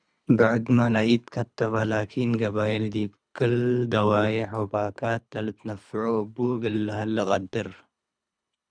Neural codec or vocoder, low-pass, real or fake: codec, 24 kHz, 3 kbps, HILCodec; 9.9 kHz; fake